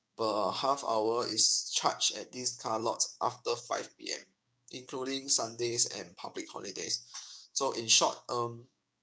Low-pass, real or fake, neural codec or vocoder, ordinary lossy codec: none; fake; codec, 16 kHz, 6 kbps, DAC; none